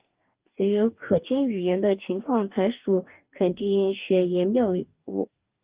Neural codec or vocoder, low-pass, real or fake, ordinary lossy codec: codec, 44.1 kHz, 2.6 kbps, DAC; 3.6 kHz; fake; Opus, 32 kbps